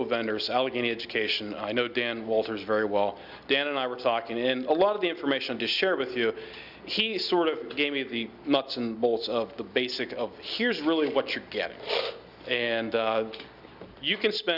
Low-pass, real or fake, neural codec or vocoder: 5.4 kHz; real; none